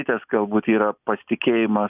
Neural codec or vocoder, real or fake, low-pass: none; real; 3.6 kHz